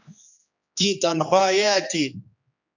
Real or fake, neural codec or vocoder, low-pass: fake; codec, 16 kHz, 2 kbps, X-Codec, HuBERT features, trained on general audio; 7.2 kHz